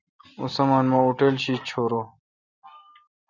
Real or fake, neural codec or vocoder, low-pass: real; none; 7.2 kHz